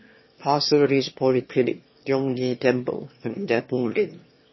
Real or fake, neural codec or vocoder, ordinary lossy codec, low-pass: fake; autoencoder, 22.05 kHz, a latent of 192 numbers a frame, VITS, trained on one speaker; MP3, 24 kbps; 7.2 kHz